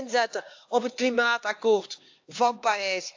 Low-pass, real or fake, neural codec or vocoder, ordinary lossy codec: 7.2 kHz; fake; codec, 16 kHz, 2 kbps, X-Codec, HuBERT features, trained on LibriSpeech; AAC, 48 kbps